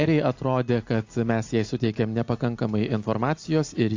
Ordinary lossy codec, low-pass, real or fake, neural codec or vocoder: AAC, 48 kbps; 7.2 kHz; real; none